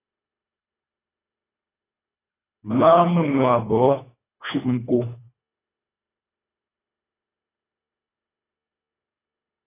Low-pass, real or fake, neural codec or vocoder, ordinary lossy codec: 3.6 kHz; fake; codec, 24 kHz, 1.5 kbps, HILCodec; AAC, 16 kbps